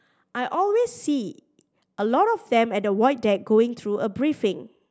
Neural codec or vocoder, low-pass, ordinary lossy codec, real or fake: none; none; none; real